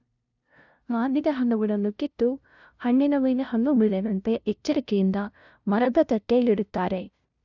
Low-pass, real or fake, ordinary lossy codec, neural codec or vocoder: 7.2 kHz; fake; none; codec, 16 kHz, 0.5 kbps, FunCodec, trained on LibriTTS, 25 frames a second